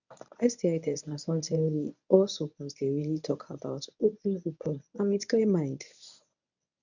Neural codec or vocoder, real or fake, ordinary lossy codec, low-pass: codec, 24 kHz, 0.9 kbps, WavTokenizer, medium speech release version 1; fake; none; 7.2 kHz